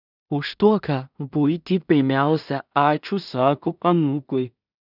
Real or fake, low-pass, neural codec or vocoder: fake; 5.4 kHz; codec, 16 kHz in and 24 kHz out, 0.4 kbps, LongCat-Audio-Codec, two codebook decoder